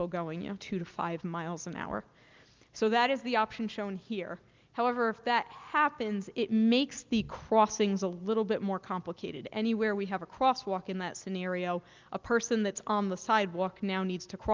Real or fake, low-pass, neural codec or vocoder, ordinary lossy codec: real; 7.2 kHz; none; Opus, 32 kbps